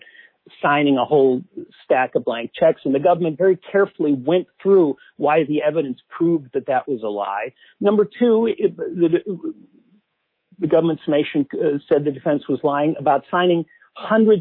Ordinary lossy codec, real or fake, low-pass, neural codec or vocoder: MP3, 24 kbps; fake; 5.4 kHz; codec, 24 kHz, 3.1 kbps, DualCodec